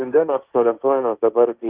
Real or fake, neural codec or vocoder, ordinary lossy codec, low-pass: fake; codec, 16 kHz, 1.1 kbps, Voila-Tokenizer; Opus, 32 kbps; 3.6 kHz